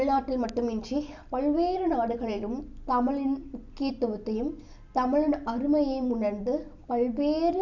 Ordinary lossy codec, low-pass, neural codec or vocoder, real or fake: none; 7.2 kHz; none; real